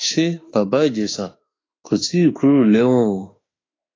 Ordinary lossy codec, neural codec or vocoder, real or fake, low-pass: AAC, 32 kbps; autoencoder, 48 kHz, 32 numbers a frame, DAC-VAE, trained on Japanese speech; fake; 7.2 kHz